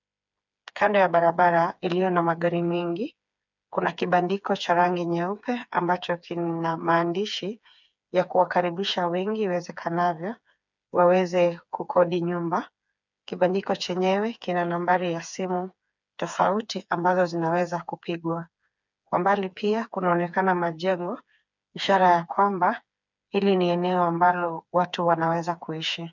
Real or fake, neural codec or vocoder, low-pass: fake; codec, 16 kHz, 4 kbps, FreqCodec, smaller model; 7.2 kHz